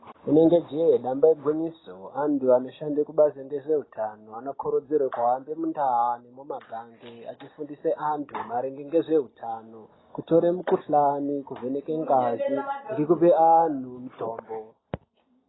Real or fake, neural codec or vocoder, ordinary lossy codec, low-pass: real; none; AAC, 16 kbps; 7.2 kHz